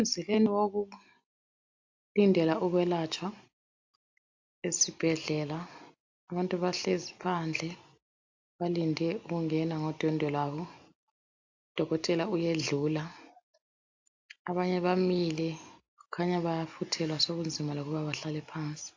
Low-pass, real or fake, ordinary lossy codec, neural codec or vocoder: 7.2 kHz; real; AAC, 48 kbps; none